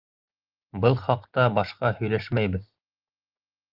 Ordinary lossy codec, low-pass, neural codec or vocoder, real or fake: Opus, 32 kbps; 5.4 kHz; none; real